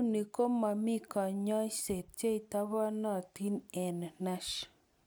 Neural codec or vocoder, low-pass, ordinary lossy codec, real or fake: none; none; none; real